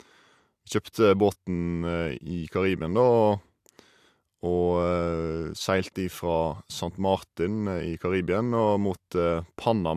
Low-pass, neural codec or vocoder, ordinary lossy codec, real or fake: 14.4 kHz; none; AAC, 96 kbps; real